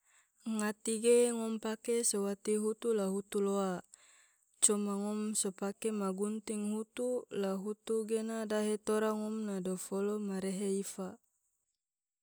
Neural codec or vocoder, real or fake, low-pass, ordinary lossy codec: none; real; none; none